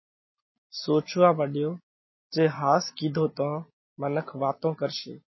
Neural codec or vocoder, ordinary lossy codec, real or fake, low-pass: none; MP3, 24 kbps; real; 7.2 kHz